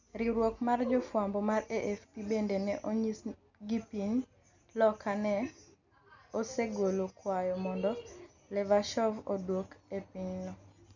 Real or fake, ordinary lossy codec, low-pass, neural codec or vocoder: real; none; 7.2 kHz; none